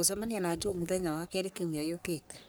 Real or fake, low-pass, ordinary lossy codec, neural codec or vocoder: fake; none; none; codec, 44.1 kHz, 3.4 kbps, Pupu-Codec